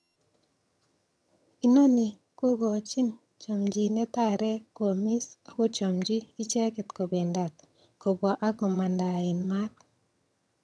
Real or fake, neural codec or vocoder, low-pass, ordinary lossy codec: fake; vocoder, 22.05 kHz, 80 mel bands, HiFi-GAN; none; none